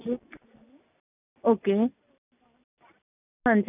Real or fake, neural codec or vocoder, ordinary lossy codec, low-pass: real; none; none; 3.6 kHz